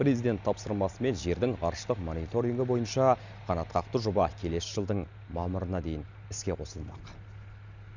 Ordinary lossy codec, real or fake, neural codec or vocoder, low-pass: none; real; none; 7.2 kHz